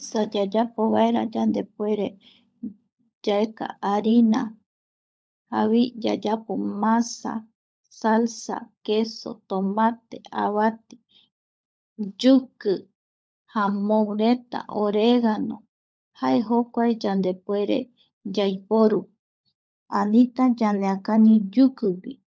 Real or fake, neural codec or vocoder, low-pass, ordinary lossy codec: fake; codec, 16 kHz, 16 kbps, FunCodec, trained on LibriTTS, 50 frames a second; none; none